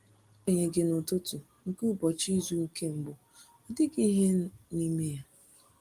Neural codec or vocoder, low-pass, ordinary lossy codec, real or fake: none; 14.4 kHz; Opus, 24 kbps; real